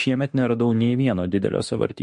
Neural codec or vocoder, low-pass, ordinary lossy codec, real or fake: autoencoder, 48 kHz, 32 numbers a frame, DAC-VAE, trained on Japanese speech; 14.4 kHz; MP3, 48 kbps; fake